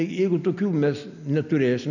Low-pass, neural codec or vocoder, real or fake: 7.2 kHz; none; real